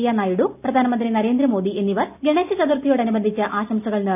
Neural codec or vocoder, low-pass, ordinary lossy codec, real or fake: none; 3.6 kHz; none; real